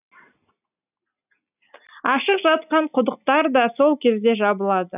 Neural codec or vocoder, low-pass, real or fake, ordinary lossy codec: none; 3.6 kHz; real; none